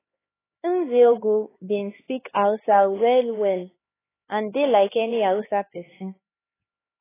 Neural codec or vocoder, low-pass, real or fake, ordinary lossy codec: codec, 16 kHz, 4 kbps, X-Codec, HuBERT features, trained on LibriSpeech; 3.6 kHz; fake; AAC, 16 kbps